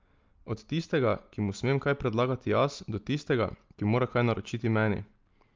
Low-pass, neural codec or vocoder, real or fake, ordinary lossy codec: 7.2 kHz; none; real; Opus, 24 kbps